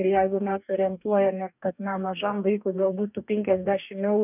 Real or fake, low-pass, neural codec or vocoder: fake; 3.6 kHz; codec, 44.1 kHz, 2.6 kbps, DAC